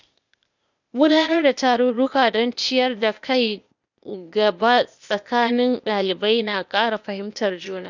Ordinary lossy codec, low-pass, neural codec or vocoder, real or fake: none; 7.2 kHz; codec, 16 kHz, 0.8 kbps, ZipCodec; fake